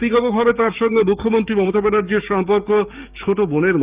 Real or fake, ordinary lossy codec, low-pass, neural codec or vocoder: fake; Opus, 32 kbps; 3.6 kHz; codec, 16 kHz, 6 kbps, DAC